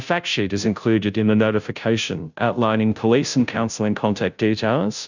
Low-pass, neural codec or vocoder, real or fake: 7.2 kHz; codec, 16 kHz, 0.5 kbps, FunCodec, trained on Chinese and English, 25 frames a second; fake